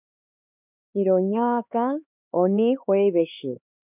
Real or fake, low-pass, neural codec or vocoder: fake; 3.6 kHz; codec, 16 kHz, 4 kbps, X-Codec, HuBERT features, trained on LibriSpeech